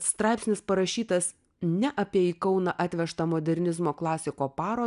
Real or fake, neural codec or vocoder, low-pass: real; none; 10.8 kHz